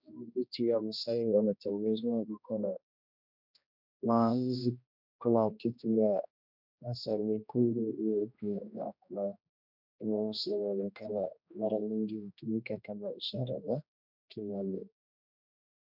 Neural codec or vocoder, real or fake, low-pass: codec, 16 kHz, 1 kbps, X-Codec, HuBERT features, trained on general audio; fake; 5.4 kHz